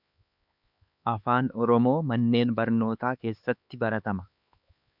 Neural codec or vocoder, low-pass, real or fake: codec, 16 kHz, 4 kbps, X-Codec, HuBERT features, trained on LibriSpeech; 5.4 kHz; fake